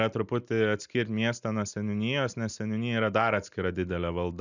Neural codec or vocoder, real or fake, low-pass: none; real; 7.2 kHz